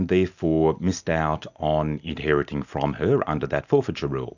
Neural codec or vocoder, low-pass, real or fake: none; 7.2 kHz; real